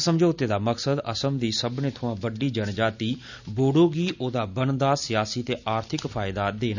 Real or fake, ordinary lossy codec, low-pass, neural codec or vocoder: real; none; 7.2 kHz; none